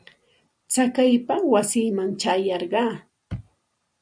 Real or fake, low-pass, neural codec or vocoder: real; 9.9 kHz; none